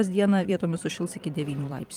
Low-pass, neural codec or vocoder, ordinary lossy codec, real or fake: 19.8 kHz; vocoder, 44.1 kHz, 128 mel bands every 512 samples, BigVGAN v2; Opus, 32 kbps; fake